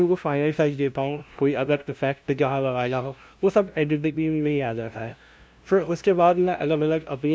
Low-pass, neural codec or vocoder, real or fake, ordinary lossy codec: none; codec, 16 kHz, 0.5 kbps, FunCodec, trained on LibriTTS, 25 frames a second; fake; none